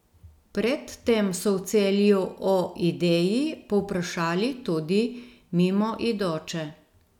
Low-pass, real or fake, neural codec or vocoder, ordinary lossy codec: 19.8 kHz; real; none; none